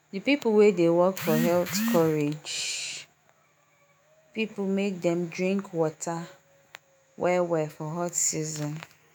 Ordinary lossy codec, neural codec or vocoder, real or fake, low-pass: none; autoencoder, 48 kHz, 128 numbers a frame, DAC-VAE, trained on Japanese speech; fake; none